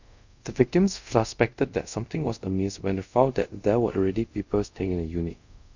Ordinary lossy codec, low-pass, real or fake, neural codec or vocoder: none; 7.2 kHz; fake; codec, 24 kHz, 0.5 kbps, DualCodec